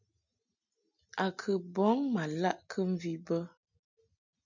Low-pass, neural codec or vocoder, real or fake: 7.2 kHz; none; real